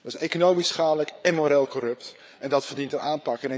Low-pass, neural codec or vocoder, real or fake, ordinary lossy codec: none; codec, 16 kHz, 4 kbps, FreqCodec, larger model; fake; none